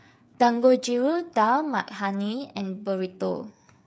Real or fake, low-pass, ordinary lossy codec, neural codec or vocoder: fake; none; none; codec, 16 kHz, 8 kbps, FreqCodec, smaller model